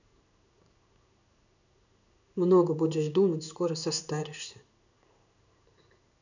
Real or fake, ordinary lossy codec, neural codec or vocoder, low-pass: fake; none; codec, 16 kHz in and 24 kHz out, 1 kbps, XY-Tokenizer; 7.2 kHz